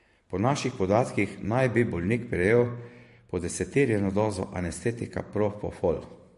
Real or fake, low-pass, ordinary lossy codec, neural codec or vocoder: fake; 14.4 kHz; MP3, 48 kbps; vocoder, 44.1 kHz, 128 mel bands every 512 samples, BigVGAN v2